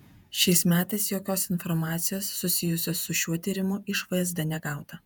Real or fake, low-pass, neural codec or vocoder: fake; 19.8 kHz; vocoder, 48 kHz, 128 mel bands, Vocos